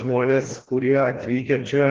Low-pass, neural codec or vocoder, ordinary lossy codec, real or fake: 7.2 kHz; codec, 16 kHz, 1 kbps, FreqCodec, larger model; Opus, 16 kbps; fake